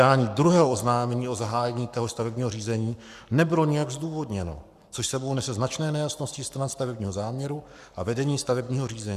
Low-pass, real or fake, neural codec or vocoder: 14.4 kHz; fake; codec, 44.1 kHz, 7.8 kbps, Pupu-Codec